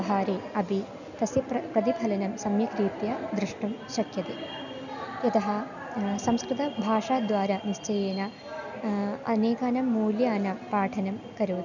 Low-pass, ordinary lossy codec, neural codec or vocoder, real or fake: 7.2 kHz; none; none; real